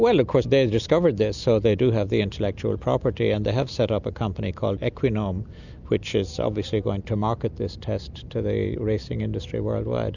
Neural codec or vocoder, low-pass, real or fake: none; 7.2 kHz; real